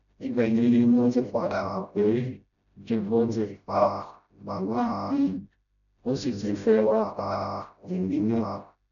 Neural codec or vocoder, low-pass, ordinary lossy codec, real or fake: codec, 16 kHz, 0.5 kbps, FreqCodec, smaller model; 7.2 kHz; none; fake